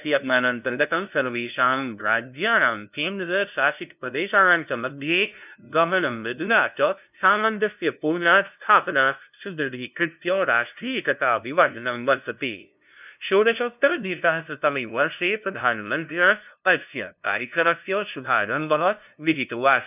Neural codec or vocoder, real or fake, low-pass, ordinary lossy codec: codec, 16 kHz, 0.5 kbps, FunCodec, trained on LibriTTS, 25 frames a second; fake; 3.6 kHz; none